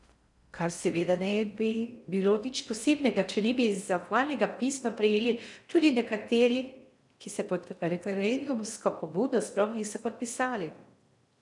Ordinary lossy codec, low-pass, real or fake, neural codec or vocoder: none; 10.8 kHz; fake; codec, 16 kHz in and 24 kHz out, 0.6 kbps, FocalCodec, streaming, 4096 codes